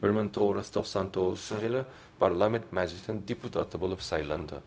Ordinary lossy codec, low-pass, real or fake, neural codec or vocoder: none; none; fake; codec, 16 kHz, 0.4 kbps, LongCat-Audio-Codec